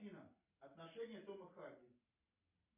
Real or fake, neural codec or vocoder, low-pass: real; none; 3.6 kHz